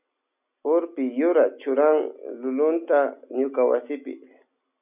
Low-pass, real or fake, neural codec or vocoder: 3.6 kHz; real; none